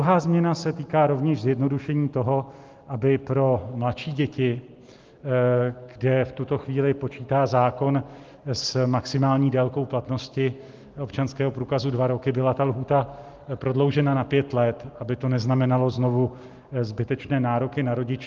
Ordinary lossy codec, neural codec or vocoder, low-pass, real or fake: Opus, 32 kbps; none; 7.2 kHz; real